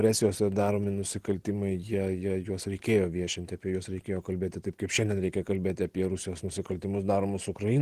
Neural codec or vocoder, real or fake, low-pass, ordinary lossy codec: none; real; 14.4 kHz; Opus, 16 kbps